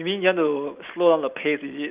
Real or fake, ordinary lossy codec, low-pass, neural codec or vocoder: real; Opus, 32 kbps; 3.6 kHz; none